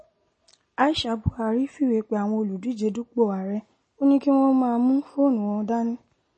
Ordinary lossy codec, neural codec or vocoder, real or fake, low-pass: MP3, 32 kbps; none; real; 10.8 kHz